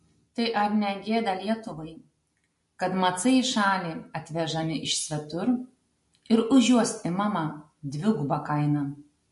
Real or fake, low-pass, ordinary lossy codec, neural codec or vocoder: real; 14.4 kHz; MP3, 48 kbps; none